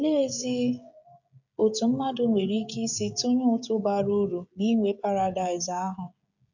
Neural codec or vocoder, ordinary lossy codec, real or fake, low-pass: codec, 16 kHz, 16 kbps, FreqCodec, smaller model; none; fake; 7.2 kHz